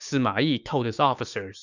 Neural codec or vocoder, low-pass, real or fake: codec, 16 kHz, 6 kbps, DAC; 7.2 kHz; fake